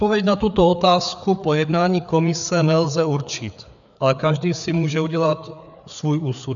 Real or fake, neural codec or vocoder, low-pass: fake; codec, 16 kHz, 4 kbps, FreqCodec, larger model; 7.2 kHz